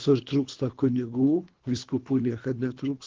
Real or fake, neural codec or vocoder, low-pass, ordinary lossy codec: fake; codec, 24 kHz, 3 kbps, HILCodec; 7.2 kHz; Opus, 16 kbps